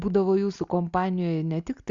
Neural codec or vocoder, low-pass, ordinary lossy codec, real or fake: none; 7.2 kHz; Opus, 64 kbps; real